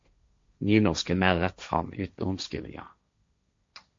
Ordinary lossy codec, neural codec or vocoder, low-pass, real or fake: MP3, 48 kbps; codec, 16 kHz, 1.1 kbps, Voila-Tokenizer; 7.2 kHz; fake